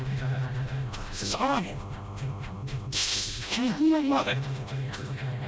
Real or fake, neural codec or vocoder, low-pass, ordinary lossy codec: fake; codec, 16 kHz, 0.5 kbps, FreqCodec, smaller model; none; none